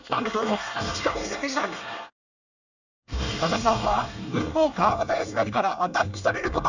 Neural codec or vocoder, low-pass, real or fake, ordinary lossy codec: codec, 24 kHz, 1 kbps, SNAC; 7.2 kHz; fake; none